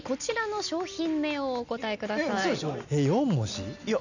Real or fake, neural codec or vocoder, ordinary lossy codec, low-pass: real; none; none; 7.2 kHz